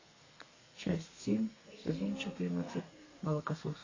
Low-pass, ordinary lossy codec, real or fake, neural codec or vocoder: 7.2 kHz; AAC, 32 kbps; fake; codec, 32 kHz, 1.9 kbps, SNAC